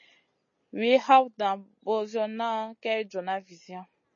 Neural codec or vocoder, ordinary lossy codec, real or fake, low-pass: none; MP3, 32 kbps; real; 7.2 kHz